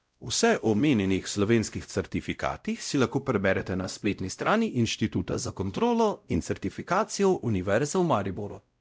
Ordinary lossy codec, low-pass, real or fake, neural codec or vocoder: none; none; fake; codec, 16 kHz, 0.5 kbps, X-Codec, WavLM features, trained on Multilingual LibriSpeech